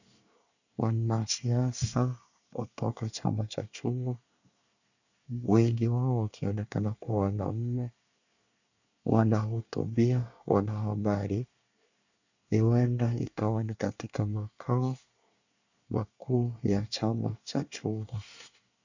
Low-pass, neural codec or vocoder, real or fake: 7.2 kHz; codec, 24 kHz, 1 kbps, SNAC; fake